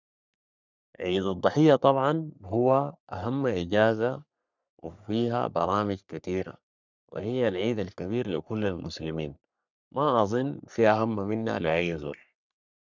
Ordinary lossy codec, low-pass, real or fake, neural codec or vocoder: none; 7.2 kHz; fake; codec, 44.1 kHz, 3.4 kbps, Pupu-Codec